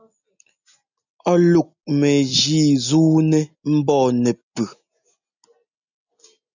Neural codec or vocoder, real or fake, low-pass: none; real; 7.2 kHz